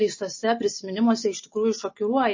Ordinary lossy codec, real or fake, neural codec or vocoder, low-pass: MP3, 32 kbps; real; none; 7.2 kHz